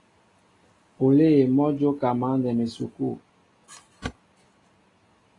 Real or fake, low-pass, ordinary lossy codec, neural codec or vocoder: real; 10.8 kHz; AAC, 32 kbps; none